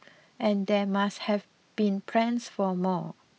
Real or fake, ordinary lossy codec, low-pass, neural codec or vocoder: real; none; none; none